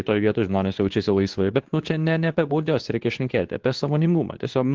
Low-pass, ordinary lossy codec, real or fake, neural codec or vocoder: 7.2 kHz; Opus, 16 kbps; fake; codec, 24 kHz, 0.9 kbps, WavTokenizer, medium speech release version 2